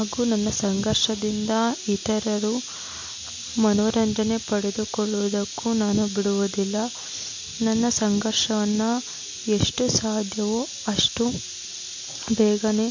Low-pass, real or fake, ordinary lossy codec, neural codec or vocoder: 7.2 kHz; real; MP3, 48 kbps; none